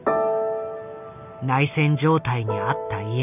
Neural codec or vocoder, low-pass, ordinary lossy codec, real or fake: none; 3.6 kHz; none; real